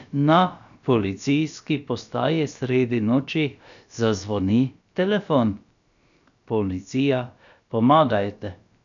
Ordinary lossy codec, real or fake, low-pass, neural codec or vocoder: none; fake; 7.2 kHz; codec, 16 kHz, about 1 kbps, DyCAST, with the encoder's durations